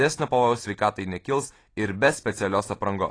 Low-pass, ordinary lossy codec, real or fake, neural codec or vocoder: 9.9 kHz; AAC, 32 kbps; real; none